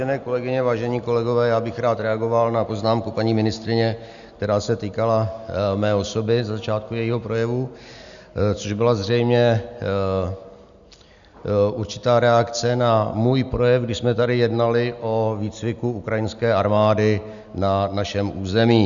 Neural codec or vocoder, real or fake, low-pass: none; real; 7.2 kHz